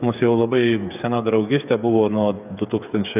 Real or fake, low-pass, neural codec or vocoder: fake; 3.6 kHz; codec, 16 kHz, 8 kbps, FreqCodec, smaller model